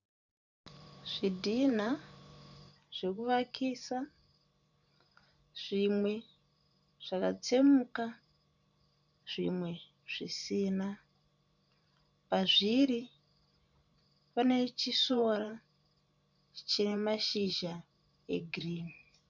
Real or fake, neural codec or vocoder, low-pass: fake; vocoder, 44.1 kHz, 128 mel bands every 512 samples, BigVGAN v2; 7.2 kHz